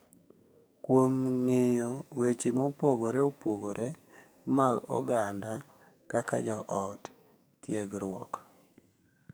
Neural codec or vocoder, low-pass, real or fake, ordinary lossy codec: codec, 44.1 kHz, 2.6 kbps, SNAC; none; fake; none